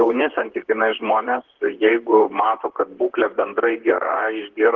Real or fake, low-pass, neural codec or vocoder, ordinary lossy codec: fake; 7.2 kHz; vocoder, 44.1 kHz, 128 mel bands, Pupu-Vocoder; Opus, 16 kbps